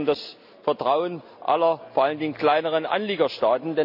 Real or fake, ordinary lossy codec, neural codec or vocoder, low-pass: real; MP3, 48 kbps; none; 5.4 kHz